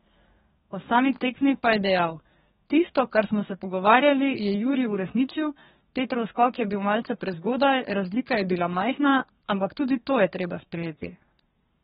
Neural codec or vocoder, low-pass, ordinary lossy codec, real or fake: codec, 32 kHz, 1.9 kbps, SNAC; 14.4 kHz; AAC, 16 kbps; fake